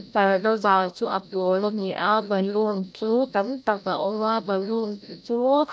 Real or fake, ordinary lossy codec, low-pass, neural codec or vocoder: fake; none; none; codec, 16 kHz, 0.5 kbps, FreqCodec, larger model